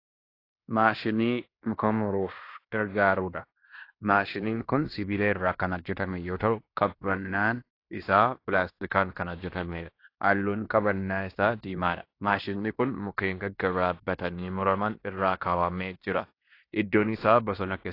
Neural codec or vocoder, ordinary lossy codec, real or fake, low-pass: codec, 16 kHz in and 24 kHz out, 0.9 kbps, LongCat-Audio-Codec, fine tuned four codebook decoder; AAC, 32 kbps; fake; 5.4 kHz